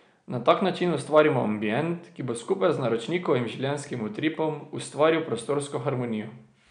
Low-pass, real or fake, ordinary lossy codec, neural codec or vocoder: 9.9 kHz; real; none; none